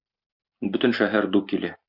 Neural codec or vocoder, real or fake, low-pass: none; real; 5.4 kHz